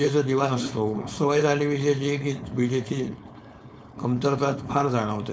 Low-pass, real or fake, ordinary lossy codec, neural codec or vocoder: none; fake; none; codec, 16 kHz, 4.8 kbps, FACodec